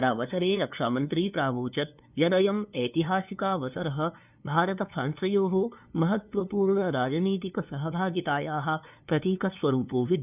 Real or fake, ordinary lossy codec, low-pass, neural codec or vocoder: fake; none; 3.6 kHz; codec, 16 kHz, 2 kbps, FunCodec, trained on LibriTTS, 25 frames a second